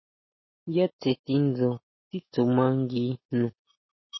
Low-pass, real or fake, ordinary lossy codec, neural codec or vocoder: 7.2 kHz; real; MP3, 24 kbps; none